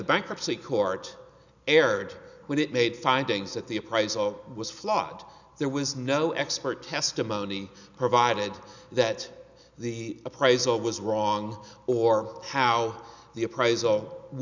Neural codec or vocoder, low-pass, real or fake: none; 7.2 kHz; real